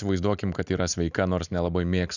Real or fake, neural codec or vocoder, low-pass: real; none; 7.2 kHz